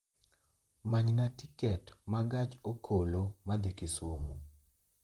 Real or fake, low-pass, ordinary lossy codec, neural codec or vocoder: fake; 19.8 kHz; Opus, 32 kbps; vocoder, 44.1 kHz, 128 mel bands, Pupu-Vocoder